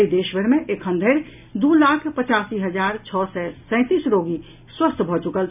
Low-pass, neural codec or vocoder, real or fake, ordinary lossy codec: 3.6 kHz; none; real; none